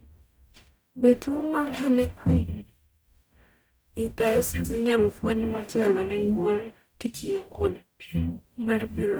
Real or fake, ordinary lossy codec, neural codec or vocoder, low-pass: fake; none; codec, 44.1 kHz, 0.9 kbps, DAC; none